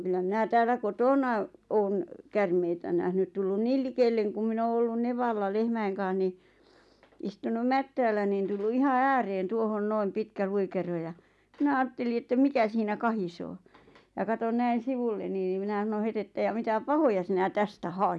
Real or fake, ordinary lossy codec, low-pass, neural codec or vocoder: real; none; none; none